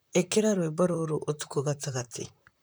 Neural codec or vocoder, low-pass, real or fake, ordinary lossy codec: vocoder, 44.1 kHz, 128 mel bands, Pupu-Vocoder; none; fake; none